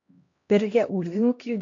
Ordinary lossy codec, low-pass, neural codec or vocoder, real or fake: AAC, 48 kbps; 7.2 kHz; codec, 16 kHz, 1 kbps, X-Codec, HuBERT features, trained on LibriSpeech; fake